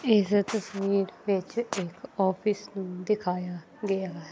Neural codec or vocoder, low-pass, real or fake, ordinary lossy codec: none; none; real; none